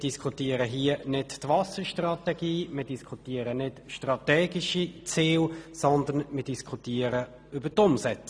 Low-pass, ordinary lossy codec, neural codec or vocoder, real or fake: none; none; none; real